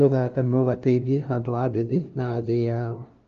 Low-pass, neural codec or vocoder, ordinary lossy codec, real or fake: 7.2 kHz; codec, 16 kHz, 0.5 kbps, FunCodec, trained on LibriTTS, 25 frames a second; Opus, 32 kbps; fake